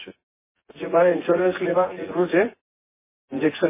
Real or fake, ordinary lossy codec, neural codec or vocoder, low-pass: fake; MP3, 16 kbps; vocoder, 24 kHz, 100 mel bands, Vocos; 3.6 kHz